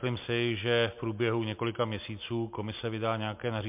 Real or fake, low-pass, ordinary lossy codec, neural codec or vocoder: real; 3.6 kHz; MP3, 32 kbps; none